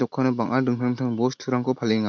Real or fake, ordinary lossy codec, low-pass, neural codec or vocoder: real; none; 7.2 kHz; none